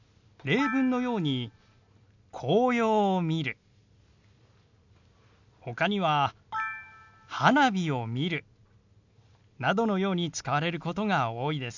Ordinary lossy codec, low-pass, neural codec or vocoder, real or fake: none; 7.2 kHz; none; real